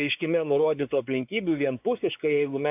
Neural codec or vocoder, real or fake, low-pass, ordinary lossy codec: codec, 16 kHz, 4 kbps, X-Codec, WavLM features, trained on Multilingual LibriSpeech; fake; 3.6 kHz; AAC, 32 kbps